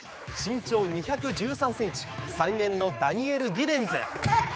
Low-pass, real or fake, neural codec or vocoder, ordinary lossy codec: none; fake; codec, 16 kHz, 4 kbps, X-Codec, HuBERT features, trained on general audio; none